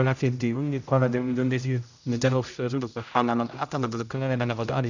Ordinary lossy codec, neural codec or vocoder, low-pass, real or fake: none; codec, 16 kHz, 0.5 kbps, X-Codec, HuBERT features, trained on general audio; 7.2 kHz; fake